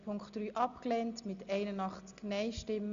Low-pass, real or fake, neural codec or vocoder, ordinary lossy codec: 7.2 kHz; real; none; Opus, 64 kbps